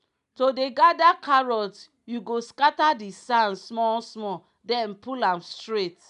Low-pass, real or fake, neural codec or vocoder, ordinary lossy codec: 10.8 kHz; real; none; none